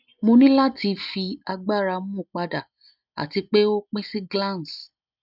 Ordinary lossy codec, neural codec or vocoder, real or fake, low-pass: none; none; real; 5.4 kHz